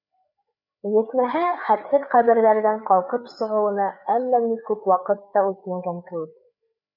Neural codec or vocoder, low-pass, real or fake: codec, 16 kHz, 4 kbps, FreqCodec, larger model; 5.4 kHz; fake